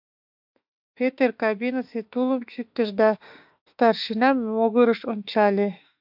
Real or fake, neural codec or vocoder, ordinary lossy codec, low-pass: fake; autoencoder, 48 kHz, 32 numbers a frame, DAC-VAE, trained on Japanese speech; AAC, 48 kbps; 5.4 kHz